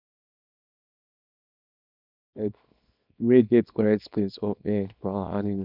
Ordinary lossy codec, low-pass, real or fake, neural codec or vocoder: none; 5.4 kHz; fake; codec, 24 kHz, 0.9 kbps, WavTokenizer, small release